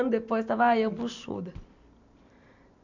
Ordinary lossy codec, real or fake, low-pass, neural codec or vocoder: none; real; 7.2 kHz; none